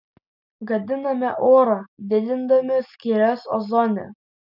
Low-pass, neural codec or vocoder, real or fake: 5.4 kHz; none; real